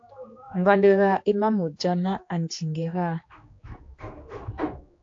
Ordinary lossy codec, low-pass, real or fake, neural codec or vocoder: AAC, 48 kbps; 7.2 kHz; fake; codec, 16 kHz, 2 kbps, X-Codec, HuBERT features, trained on general audio